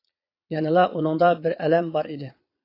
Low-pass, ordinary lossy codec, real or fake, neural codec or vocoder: 5.4 kHz; AAC, 32 kbps; fake; vocoder, 22.05 kHz, 80 mel bands, Vocos